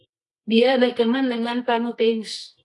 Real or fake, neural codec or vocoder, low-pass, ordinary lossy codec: fake; codec, 24 kHz, 0.9 kbps, WavTokenizer, medium music audio release; 10.8 kHz; AAC, 48 kbps